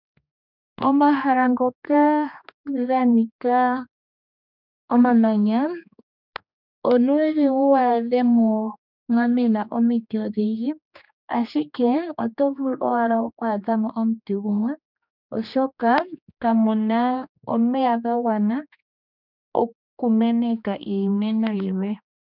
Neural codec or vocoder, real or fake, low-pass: codec, 16 kHz, 2 kbps, X-Codec, HuBERT features, trained on general audio; fake; 5.4 kHz